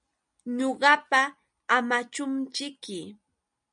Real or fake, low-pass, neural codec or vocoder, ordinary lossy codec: real; 9.9 kHz; none; MP3, 96 kbps